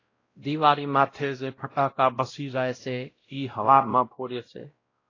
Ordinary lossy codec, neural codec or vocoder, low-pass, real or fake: AAC, 32 kbps; codec, 16 kHz, 0.5 kbps, X-Codec, WavLM features, trained on Multilingual LibriSpeech; 7.2 kHz; fake